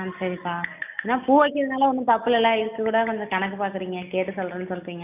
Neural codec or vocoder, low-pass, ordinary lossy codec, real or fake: none; 3.6 kHz; none; real